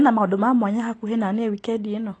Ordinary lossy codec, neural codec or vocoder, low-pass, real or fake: AAC, 32 kbps; none; 9.9 kHz; real